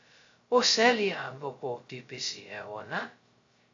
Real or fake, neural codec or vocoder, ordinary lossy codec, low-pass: fake; codec, 16 kHz, 0.2 kbps, FocalCodec; AAC, 48 kbps; 7.2 kHz